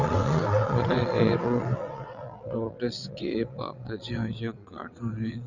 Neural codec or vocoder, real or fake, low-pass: vocoder, 22.05 kHz, 80 mel bands, WaveNeXt; fake; 7.2 kHz